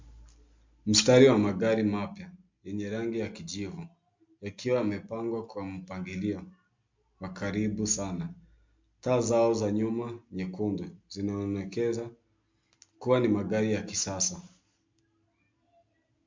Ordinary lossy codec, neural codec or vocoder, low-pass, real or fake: MP3, 64 kbps; none; 7.2 kHz; real